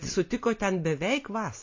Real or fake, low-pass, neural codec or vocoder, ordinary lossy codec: real; 7.2 kHz; none; MP3, 32 kbps